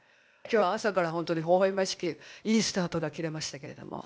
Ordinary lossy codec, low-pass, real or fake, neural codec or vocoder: none; none; fake; codec, 16 kHz, 0.8 kbps, ZipCodec